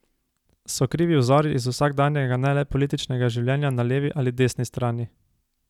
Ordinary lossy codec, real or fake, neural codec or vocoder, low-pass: none; real; none; 19.8 kHz